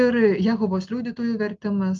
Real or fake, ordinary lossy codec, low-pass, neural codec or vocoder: real; Opus, 24 kbps; 7.2 kHz; none